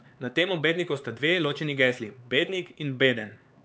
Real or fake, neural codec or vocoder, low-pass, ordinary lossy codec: fake; codec, 16 kHz, 4 kbps, X-Codec, HuBERT features, trained on LibriSpeech; none; none